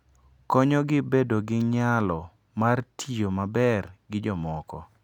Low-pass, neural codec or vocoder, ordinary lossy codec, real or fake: 19.8 kHz; none; none; real